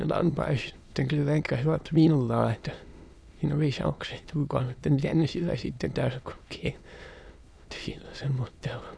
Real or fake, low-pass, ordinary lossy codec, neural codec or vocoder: fake; none; none; autoencoder, 22.05 kHz, a latent of 192 numbers a frame, VITS, trained on many speakers